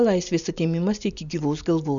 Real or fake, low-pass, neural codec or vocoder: real; 7.2 kHz; none